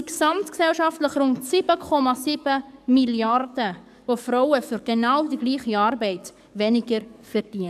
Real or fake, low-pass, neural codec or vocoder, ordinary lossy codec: fake; 14.4 kHz; codec, 44.1 kHz, 7.8 kbps, DAC; none